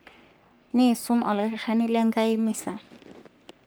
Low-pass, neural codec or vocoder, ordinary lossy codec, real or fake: none; codec, 44.1 kHz, 3.4 kbps, Pupu-Codec; none; fake